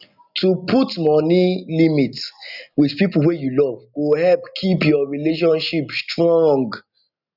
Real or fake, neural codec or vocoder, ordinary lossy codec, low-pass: real; none; none; 5.4 kHz